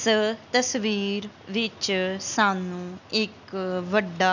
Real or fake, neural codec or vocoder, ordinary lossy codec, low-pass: real; none; none; 7.2 kHz